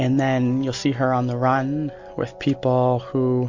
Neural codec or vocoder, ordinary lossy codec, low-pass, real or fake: none; MP3, 48 kbps; 7.2 kHz; real